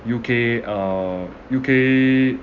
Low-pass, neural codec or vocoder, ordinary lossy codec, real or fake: 7.2 kHz; none; none; real